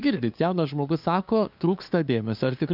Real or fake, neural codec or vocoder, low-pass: fake; codec, 16 kHz, 2 kbps, FunCodec, trained on LibriTTS, 25 frames a second; 5.4 kHz